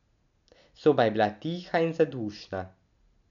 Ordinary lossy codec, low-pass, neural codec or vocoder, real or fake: none; 7.2 kHz; none; real